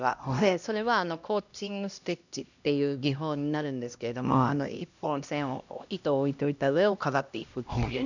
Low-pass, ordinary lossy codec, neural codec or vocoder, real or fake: 7.2 kHz; none; codec, 16 kHz, 1 kbps, X-Codec, HuBERT features, trained on LibriSpeech; fake